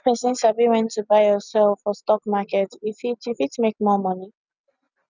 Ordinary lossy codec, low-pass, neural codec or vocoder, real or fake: none; 7.2 kHz; none; real